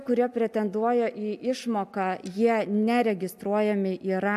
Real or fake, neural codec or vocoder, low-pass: real; none; 14.4 kHz